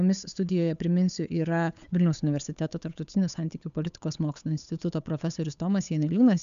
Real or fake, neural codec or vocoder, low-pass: fake; codec, 16 kHz, 8 kbps, FunCodec, trained on Chinese and English, 25 frames a second; 7.2 kHz